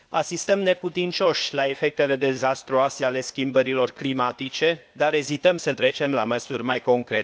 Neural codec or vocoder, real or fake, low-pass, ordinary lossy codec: codec, 16 kHz, 0.8 kbps, ZipCodec; fake; none; none